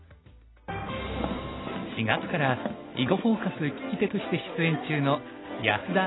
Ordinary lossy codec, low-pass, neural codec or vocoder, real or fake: AAC, 16 kbps; 7.2 kHz; none; real